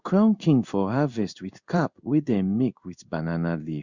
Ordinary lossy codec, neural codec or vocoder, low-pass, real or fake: Opus, 64 kbps; codec, 16 kHz in and 24 kHz out, 1 kbps, XY-Tokenizer; 7.2 kHz; fake